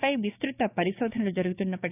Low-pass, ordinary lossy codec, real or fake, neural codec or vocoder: 3.6 kHz; none; fake; codec, 16 kHz, 6 kbps, DAC